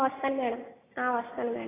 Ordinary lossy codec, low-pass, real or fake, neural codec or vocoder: AAC, 16 kbps; 3.6 kHz; real; none